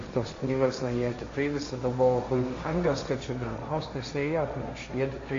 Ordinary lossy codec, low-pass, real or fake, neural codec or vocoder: MP3, 48 kbps; 7.2 kHz; fake; codec, 16 kHz, 1.1 kbps, Voila-Tokenizer